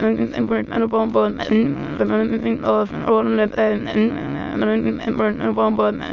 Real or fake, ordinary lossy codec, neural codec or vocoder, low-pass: fake; MP3, 64 kbps; autoencoder, 22.05 kHz, a latent of 192 numbers a frame, VITS, trained on many speakers; 7.2 kHz